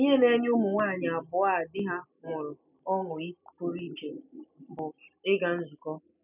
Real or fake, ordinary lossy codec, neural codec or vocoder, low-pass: real; none; none; 3.6 kHz